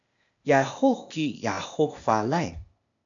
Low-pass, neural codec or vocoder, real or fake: 7.2 kHz; codec, 16 kHz, 0.8 kbps, ZipCodec; fake